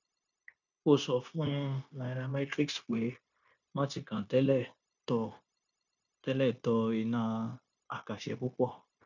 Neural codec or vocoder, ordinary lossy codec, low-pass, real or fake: codec, 16 kHz, 0.9 kbps, LongCat-Audio-Codec; none; 7.2 kHz; fake